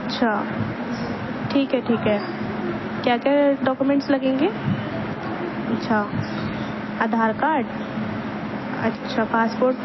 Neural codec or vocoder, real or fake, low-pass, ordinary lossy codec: none; real; 7.2 kHz; MP3, 24 kbps